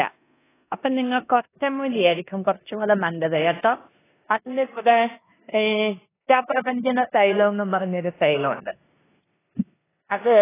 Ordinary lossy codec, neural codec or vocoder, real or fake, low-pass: AAC, 16 kbps; codec, 16 kHz in and 24 kHz out, 0.9 kbps, LongCat-Audio-Codec, four codebook decoder; fake; 3.6 kHz